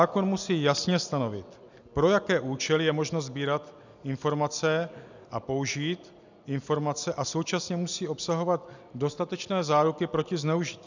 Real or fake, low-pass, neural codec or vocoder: real; 7.2 kHz; none